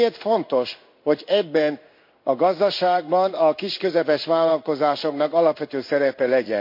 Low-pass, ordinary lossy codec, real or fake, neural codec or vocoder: 5.4 kHz; MP3, 32 kbps; fake; codec, 16 kHz in and 24 kHz out, 1 kbps, XY-Tokenizer